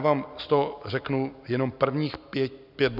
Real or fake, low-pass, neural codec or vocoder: real; 5.4 kHz; none